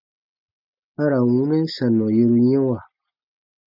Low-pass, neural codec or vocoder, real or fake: 5.4 kHz; none; real